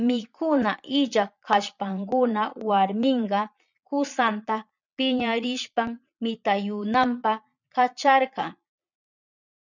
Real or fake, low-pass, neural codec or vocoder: fake; 7.2 kHz; vocoder, 22.05 kHz, 80 mel bands, Vocos